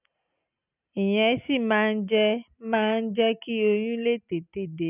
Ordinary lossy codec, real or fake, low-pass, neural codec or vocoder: none; real; 3.6 kHz; none